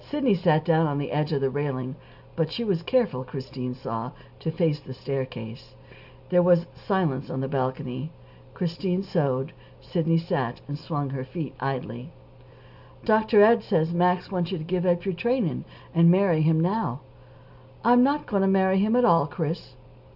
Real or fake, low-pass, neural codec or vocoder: real; 5.4 kHz; none